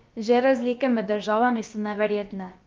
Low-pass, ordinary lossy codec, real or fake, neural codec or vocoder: 7.2 kHz; Opus, 32 kbps; fake; codec, 16 kHz, about 1 kbps, DyCAST, with the encoder's durations